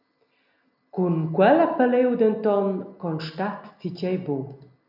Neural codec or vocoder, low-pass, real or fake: none; 5.4 kHz; real